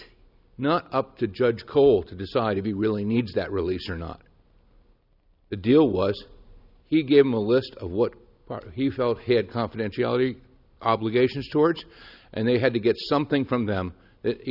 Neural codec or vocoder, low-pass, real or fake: none; 5.4 kHz; real